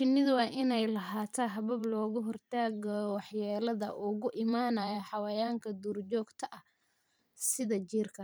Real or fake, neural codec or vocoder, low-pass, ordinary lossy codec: fake; vocoder, 44.1 kHz, 128 mel bands every 512 samples, BigVGAN v2; none; none